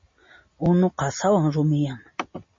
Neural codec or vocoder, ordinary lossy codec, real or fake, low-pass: none; MP3, 32 kbps; real; 7.2 kHz